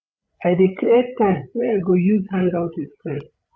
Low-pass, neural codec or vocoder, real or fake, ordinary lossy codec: none; codec, 16 kHz, 8 kbps, FreqCodec, larger model; fake; none